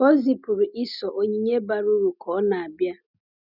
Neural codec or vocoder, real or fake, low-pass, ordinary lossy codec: none; real; 5.4 kHz; none